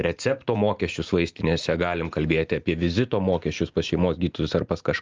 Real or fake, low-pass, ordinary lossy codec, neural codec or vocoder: real; 7.2 kHz; Opus, 24 kbps; none